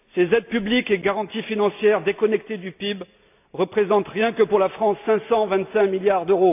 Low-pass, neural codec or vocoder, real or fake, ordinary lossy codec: 3.6 kHz; none; real; AAC, 32 kbps